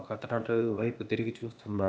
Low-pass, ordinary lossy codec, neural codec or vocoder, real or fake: none; none; codec, 16 kHz, 0.8 kbps, ZipCodec; fake